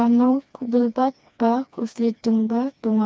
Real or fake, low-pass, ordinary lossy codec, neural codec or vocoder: fake; none; none; codec, 16 kHz, 2 kbps, FreqCodec, smaller model